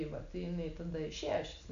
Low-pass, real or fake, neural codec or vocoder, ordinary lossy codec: 7.2 kHz; real; none; AAC, 96 kbps